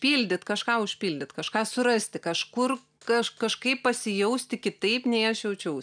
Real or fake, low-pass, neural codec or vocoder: real; 9.9 kHz; none